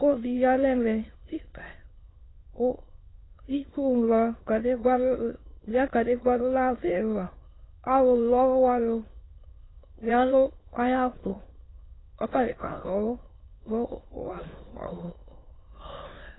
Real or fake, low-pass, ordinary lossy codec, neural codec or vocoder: fake; 7.2 kHz; AAC, 16 kbps; autoencoder, 22.05 kHz, a latent of 192 numbers a frame, VITS, trained on many speakers